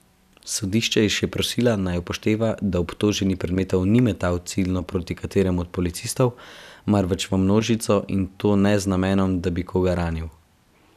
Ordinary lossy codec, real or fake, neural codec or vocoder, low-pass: none; real; none; 14.4 kHz